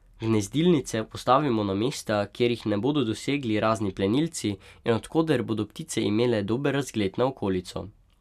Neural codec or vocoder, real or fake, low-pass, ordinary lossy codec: none; real; 14.4 kHz; none